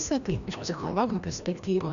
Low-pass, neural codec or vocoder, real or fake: 7.2 kHz; codec, 16 kHz, 1 kbps, FreqCodec, larger model; fake